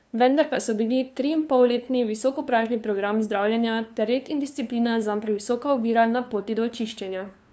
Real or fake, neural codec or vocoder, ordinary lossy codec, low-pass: fake; codec, 16 kHz, 2 kbps, FunCodec, trained on LibriTTS, 25 frames a second; none; none